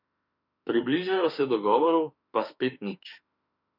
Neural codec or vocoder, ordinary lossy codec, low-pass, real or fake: autoencoder, 48 kHz, 32 numbers a frame, DAC-VAE, trained on Japanese speech; none; 5.4 kHz; fake